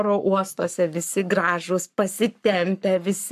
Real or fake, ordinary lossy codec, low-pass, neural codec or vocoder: fake; AAC, 96 kbps; 14.4 kHz; codec, 44.1 kHz, 7.8 kbps, Pupu-Codec